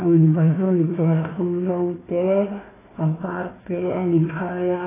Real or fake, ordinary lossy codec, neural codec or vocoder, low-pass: fake; AAC, 16 kbps; codec, 16 kHz, 1 kbps, FunCodec, trained on Chinese and English, 50 frames a second; 3.6 kHz